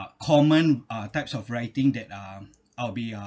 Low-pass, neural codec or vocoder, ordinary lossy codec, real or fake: none; none; none; real